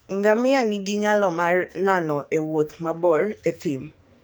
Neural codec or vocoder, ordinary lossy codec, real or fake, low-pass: codec, 44.1 kHz, 2.6 kbps, SNAC; none; fake; none